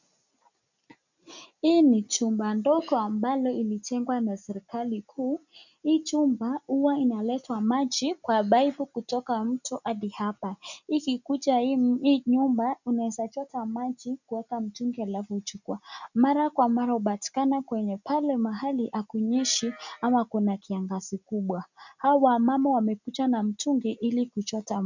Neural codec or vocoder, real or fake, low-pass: none; real; 7.2 kHz